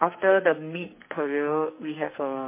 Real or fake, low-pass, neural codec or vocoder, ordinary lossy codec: fake; 3.6 kHz; codec, 44.1 kHz, 2.6 kbps, SNAC; MP3, 24 kbps